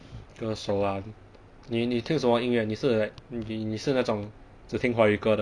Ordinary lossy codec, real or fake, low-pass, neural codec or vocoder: none; real; 9.9 kHz; none